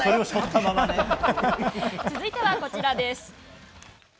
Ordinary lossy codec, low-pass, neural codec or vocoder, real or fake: none; none; none; real